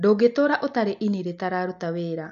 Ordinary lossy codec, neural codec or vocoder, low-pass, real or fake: MP3, 64 kbps; none; 7.2 kHz; real